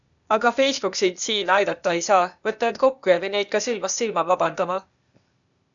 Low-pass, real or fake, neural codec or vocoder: 7.2 kHz; fake; codec, 16 kHz, 0.8 kbps, ZipCodec